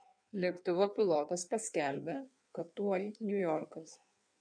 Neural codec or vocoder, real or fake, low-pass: codec, 16 kHz in and 24 kHz out, 1.1 kbps, FireRedTTS-2 codec; fake; 9.9 kHz